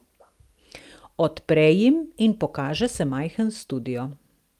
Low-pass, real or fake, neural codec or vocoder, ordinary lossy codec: 14.4 kHz; real; none; Opus, 32 kbps